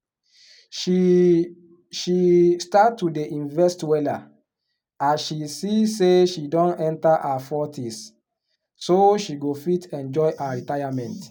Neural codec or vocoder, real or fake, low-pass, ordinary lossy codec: none; real; 19.8 kHz; none